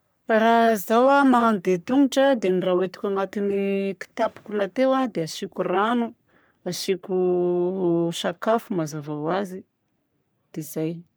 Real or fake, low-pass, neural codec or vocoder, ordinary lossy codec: fake; none; codec, 44.1 kHz, 3.4 kbps, Pupu-Codec; none